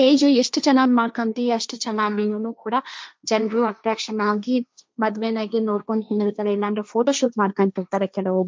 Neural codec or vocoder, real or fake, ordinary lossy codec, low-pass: codec, 16 kHz, 1.1 kbps, Voila-Tokenizer; fake; none; none